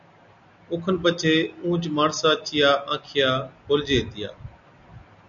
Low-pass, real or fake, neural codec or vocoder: 7.2 kHz; real; none